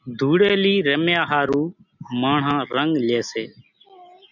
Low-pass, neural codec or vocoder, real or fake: 7.2 kHz; none; real